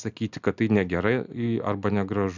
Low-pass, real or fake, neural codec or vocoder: 7.2 kHz; real; none